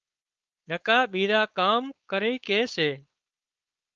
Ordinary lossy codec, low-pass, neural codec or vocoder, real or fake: Opus, 24 kbps; 7.2 kHz; codec, 16 kHz, 4.8 kbps, FACodec; fake